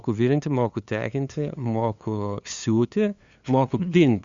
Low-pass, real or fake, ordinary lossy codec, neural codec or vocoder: 7.2 kHz; fake; Opus, 64 kbps; codec, 16 kHz, 2 kbps, FunCodec, trained on LibriTTS, 25 frames a second